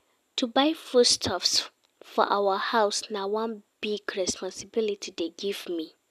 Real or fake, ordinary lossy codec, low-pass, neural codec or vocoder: real; none; 14.4 kHz; none